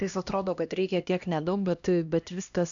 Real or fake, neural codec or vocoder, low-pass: fake; codec, 16 kHz, 1 kbps, X-Codec, HuBERT features, trained on LibriSpeech; 7.2 kHz